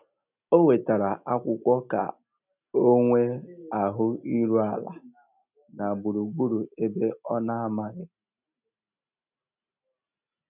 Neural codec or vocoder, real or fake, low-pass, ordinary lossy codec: none; real; 3.6 kHz; none